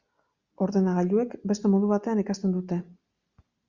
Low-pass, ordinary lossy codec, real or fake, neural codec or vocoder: 7.2 kHz; Opus, 64 kbps; real; none